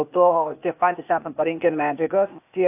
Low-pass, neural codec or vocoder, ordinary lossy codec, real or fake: 3.6 kHz; codec, 16 kHz, 0.8 kbps, ZipCodec; AAC, 32 kbps; fake